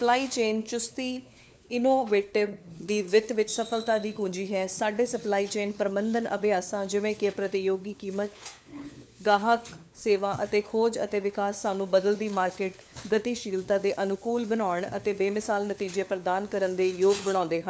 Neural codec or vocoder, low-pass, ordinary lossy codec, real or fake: codec, 16 kHz, 4 kbps, FunCodec, trained on LibriTTS, 50 frames a second; none; none; fake